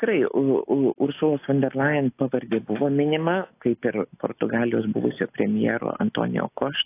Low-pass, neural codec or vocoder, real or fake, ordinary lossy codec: 3.6 kHz; none; real; AAC, 24 kbps